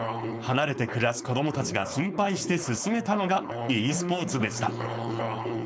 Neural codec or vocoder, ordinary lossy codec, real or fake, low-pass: codec, 16 kHz, 4.8 kbps, FACodec; none; fake; none